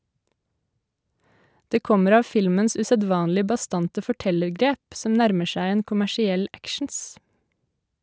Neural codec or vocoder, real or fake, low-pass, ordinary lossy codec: none; real; none; none